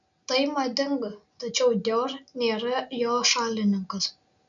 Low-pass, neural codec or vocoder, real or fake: 7.2 kHz; none; real